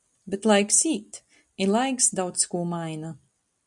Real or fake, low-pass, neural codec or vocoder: real; 10.8 kHz; none